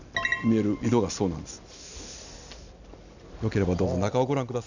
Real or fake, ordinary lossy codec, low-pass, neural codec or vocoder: real; none; 7.2 kHz; none